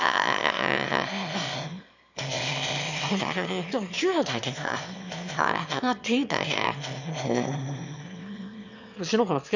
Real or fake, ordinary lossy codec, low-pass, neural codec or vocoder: fake; none; 7.2 kHz; autoencoder, 22.05 kHz, a latent of 192 numbers a frame, VITS, trained on one speaker